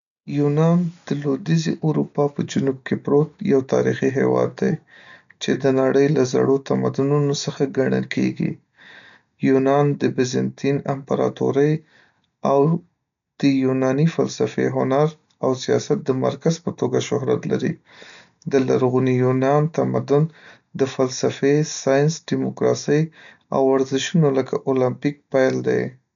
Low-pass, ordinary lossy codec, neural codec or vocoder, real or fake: 7.2 kHz; none; none; real